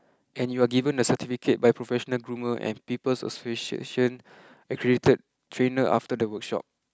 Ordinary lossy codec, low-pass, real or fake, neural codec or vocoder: none; none; real; none